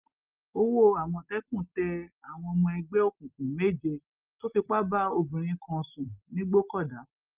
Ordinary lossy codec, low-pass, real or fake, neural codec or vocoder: Opus, 32 kbps; 3.6 kHz; real; none